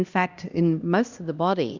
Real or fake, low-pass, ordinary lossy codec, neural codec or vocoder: fake; 7.2 kHz; Opus, 64 kbps; codec, 16 kHz, 1 kbps, X-Codec, HuBERT features, trained on LibriSpeech